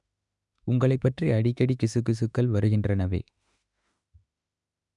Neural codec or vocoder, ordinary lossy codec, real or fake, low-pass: autoencoder, 48 kHz, 32 numbers a frame, DAC-VAE, trained on Japanese speech; none; fake; 10.8 kHz